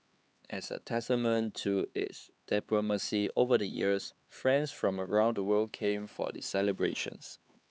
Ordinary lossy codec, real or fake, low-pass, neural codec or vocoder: none; fake; none; codec, 16 kHz, 4 kbps, X-Codec, HuBERT features, trained on LibriSpeech